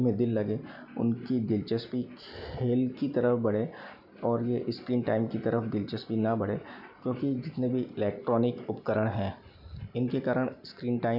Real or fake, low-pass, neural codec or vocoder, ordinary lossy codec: real; 5.4 kHz; none; none